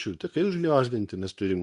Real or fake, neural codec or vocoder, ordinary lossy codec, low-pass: fake; codec, 24 kHz, 0.9 kbps, WavTokenizer, medium speech release version 2; AAC, 96 kbps; 10.8 kHz